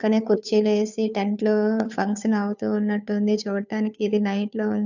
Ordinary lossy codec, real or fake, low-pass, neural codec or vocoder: none; fake; 7.2 kHz; codec, 16 kHz, 2 kbps, FunCodec, trained on Chinese and English, 25 frames a second